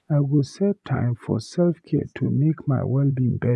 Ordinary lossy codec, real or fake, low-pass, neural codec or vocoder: none; fake; none; vocoder, 24 kHz, 100 mel bands, Vocos